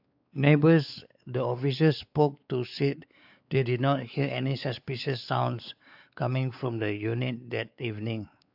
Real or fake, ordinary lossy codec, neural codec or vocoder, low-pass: fake; AAC, 48 kbps; codec, 16 kHz, 4 kbps, X-Codec, WavLM features, trained on Multilingual LibriSpeech; 5.4 kHz